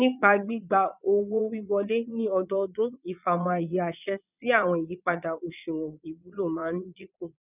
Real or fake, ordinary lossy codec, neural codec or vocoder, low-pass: fake; none; vocoder, 22.05 kHz, 80 mel bands, Vocos; 3.6 kHz